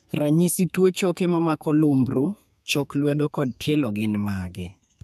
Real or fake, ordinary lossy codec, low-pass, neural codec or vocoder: fake; none; 14.4 kHz; codec, 32 kHz, 1.9 kbps, SNAC